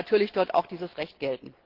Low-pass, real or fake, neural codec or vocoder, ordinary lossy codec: 5.4 kHz; real; none; Opus, 16 kbps